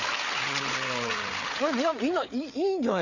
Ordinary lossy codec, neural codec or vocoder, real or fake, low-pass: none; codec, 16 kHz, 8 kbps, FreqCodec, larger model; fake; 7.2 kHz